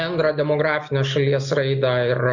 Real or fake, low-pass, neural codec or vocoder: real; 7.2 kHz; none